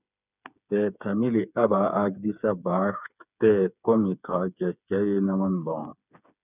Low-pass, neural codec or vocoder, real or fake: 3.6 kHz; codec, 16 kHz, 4 kbps, FreqCodec, smaller model; fake